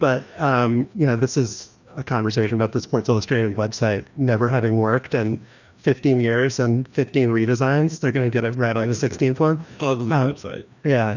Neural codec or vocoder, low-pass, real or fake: codec, 16 kHz, 1 kbps, FreqCodec, larger model; 7.2 kHz; fake